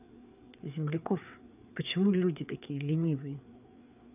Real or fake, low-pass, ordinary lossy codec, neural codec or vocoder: fake; 3.6 kHz; none; codec, 16 kHz, 4 kbps, FreqCodec, larger model